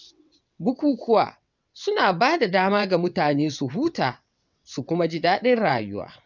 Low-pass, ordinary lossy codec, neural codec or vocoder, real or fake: 7.2 kHz; none; vocoder, 22.05 kHz, 80 mel bands, WaveNeXt; fake